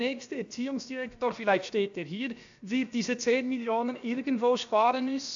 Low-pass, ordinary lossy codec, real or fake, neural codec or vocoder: 7.2 kHz; AAC, 64 kbps; fake; codec, 16 kHz, about 1 kbps, DyCAST, with the encoder's durations